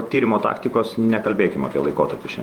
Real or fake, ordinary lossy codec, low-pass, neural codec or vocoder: fake; Opus, 32 kbps; 19.8 kHz; vocoder, 48 kHz, 128 mel bands, Vocos